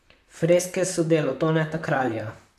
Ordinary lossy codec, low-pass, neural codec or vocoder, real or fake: none; 14.4 kHz; vocoder, 44.1 kHz, 128 mel bands, Pupu-Vocoder; fake